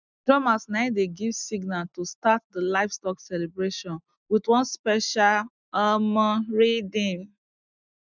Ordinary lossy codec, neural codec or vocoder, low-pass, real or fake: none; none; 7.2 kHz; real